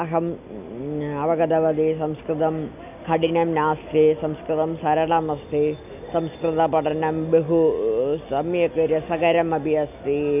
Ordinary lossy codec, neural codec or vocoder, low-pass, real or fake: none; none; 3.6 kHz; real